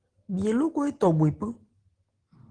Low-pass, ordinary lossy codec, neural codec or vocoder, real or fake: 9.9 kHz; Opus, 16 kbps; none; real